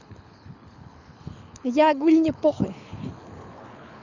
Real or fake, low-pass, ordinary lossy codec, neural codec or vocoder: fake; 7.2 kHz; none; codec, 24 kHz, 6 kbps, HILCodec